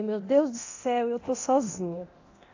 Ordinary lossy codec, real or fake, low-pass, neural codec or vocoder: AAC, 48 kbps; fake; 7.2 kHz; codec, 24 kHz, 0.9 kbps, DualCodec